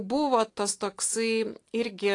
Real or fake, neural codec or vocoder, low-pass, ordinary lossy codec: real; none; 10.8 kHz; AAC, 48 kbps